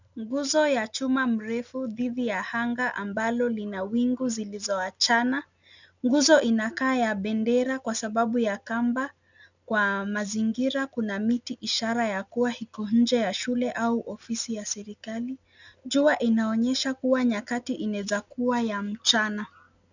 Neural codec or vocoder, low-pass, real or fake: none; 7.2 kHz; real